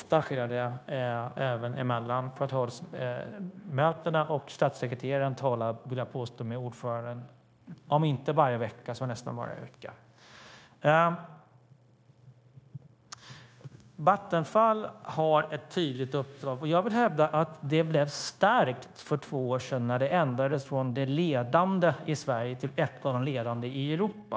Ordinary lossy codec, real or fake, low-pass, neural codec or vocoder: none; fake; none; codec, 16 kHz, 0.9 kbps, LongCat-Audio-Codec